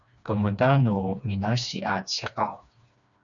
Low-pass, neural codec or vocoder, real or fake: 7.2 kHz; codec, 16 kHz, 2 kbps, FreqCodec, smaller model; fake